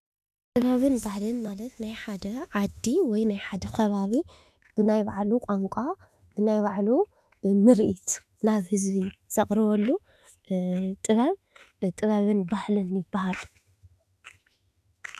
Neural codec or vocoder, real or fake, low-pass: autoencoder, 48 kHz, 32 numbers a frame, DAC-VAE, trained on Japanese speech; fake; 14.4 kHz